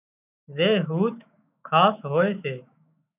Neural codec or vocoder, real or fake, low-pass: autoencoder, 48 kHz, 128 numbers a frame, DAC-VAE, trained on Japanese speech; fake; 3.6 kHz